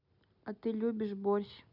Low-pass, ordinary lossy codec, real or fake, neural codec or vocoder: 5.4 kHz; none; real; none